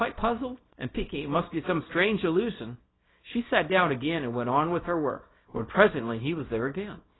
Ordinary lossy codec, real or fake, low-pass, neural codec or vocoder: AAC, 16 kbps; fake; 7.2 kHz; codec, 24 kHz, 0.9 kbps, WavTokenizer, small release